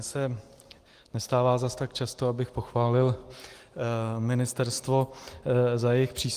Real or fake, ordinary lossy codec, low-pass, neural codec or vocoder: real; Opus, 24 kbps; 14.4 kHz; none